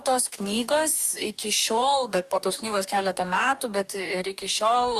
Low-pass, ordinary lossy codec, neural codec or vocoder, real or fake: 14.4 kHz; Opus, 64 kbps; codec, 44.1 kHz, 2.6 kbps, DAC; fake